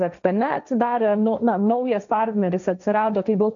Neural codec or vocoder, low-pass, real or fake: codec, 16 kHz, 1.1 kbps, Voila-Tokenizer; 7.2 kHz; fake